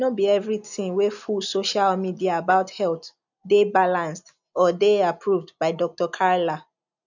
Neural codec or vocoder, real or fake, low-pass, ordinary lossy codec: none; real; 7.2 kHz; none